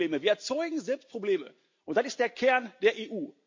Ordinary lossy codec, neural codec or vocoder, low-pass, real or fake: MP3, 48 kbps; none; 7.2 kHz; real